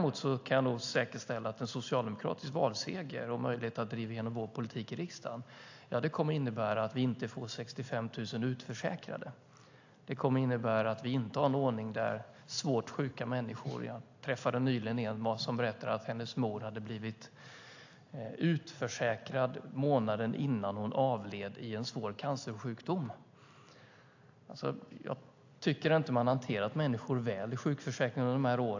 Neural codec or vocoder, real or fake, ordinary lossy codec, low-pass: none; real; AAC, 48 kbps; 7.2 kHz